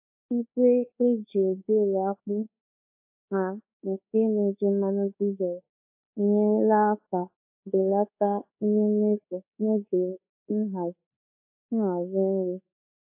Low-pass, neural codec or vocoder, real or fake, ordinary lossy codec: 3.6 kHz; codec, 24 kHz, 1.2 kbps, DualCodec; fake; AAC, 32 kbps